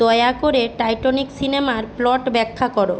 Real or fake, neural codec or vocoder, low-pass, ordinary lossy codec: real; none; none; none